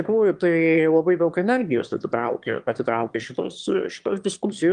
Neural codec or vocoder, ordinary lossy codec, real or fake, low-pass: autoencoder, 22.05 kHz, a latent of 192 numbers a frame, VITS, trained on one speaker; Opus, 32 kbps; fake; 9.9 kHz